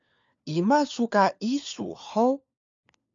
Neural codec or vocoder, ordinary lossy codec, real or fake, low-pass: codec, 16 kHz, 4 kbps, FunCodec, trained on LibriTTS, 50 frames a second; MP3, 64 kbps; fake; 7.2 kHz